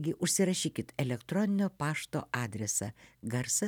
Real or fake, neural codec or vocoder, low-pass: real; none; 19.8 kHz